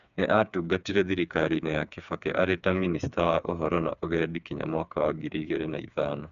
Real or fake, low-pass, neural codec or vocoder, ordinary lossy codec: fake; 7.2 kHz; codec, 16 kHz, 4 kbps, FreqCodec, smaller model; none